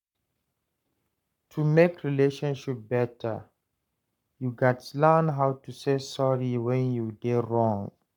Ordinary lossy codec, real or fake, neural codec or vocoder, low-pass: none; fake; codec, 44.1 kHz, 7.8 kbps, Pupu-Codec; 19.8 kHz